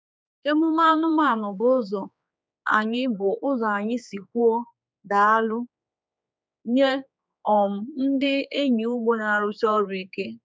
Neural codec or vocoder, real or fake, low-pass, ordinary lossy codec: codec, 16 kHz, 4 kbps, X-Codec, HuBERT features, trained on general audio; fake; none; none